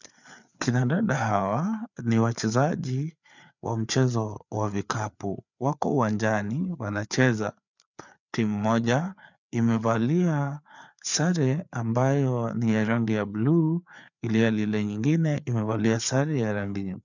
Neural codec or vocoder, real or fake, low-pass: codec, 16 kHz, 4 kbps, FreqCodec, larger model; fake; 7.2 kHz